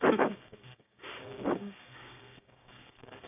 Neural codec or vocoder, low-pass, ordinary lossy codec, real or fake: codec, 16 kHz, 6 kbps, DAC; 3.6 kHz; none; fake